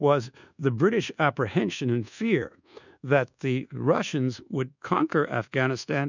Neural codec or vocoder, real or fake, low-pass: codec, 24 kHz, 1.2 kbps, DualCodec; fake; 7.2 kHz